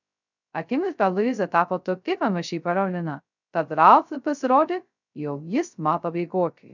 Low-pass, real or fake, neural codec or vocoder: 7.2 kHz; fake; codec, 16 kHz, 0.2 kbps, FocalCodec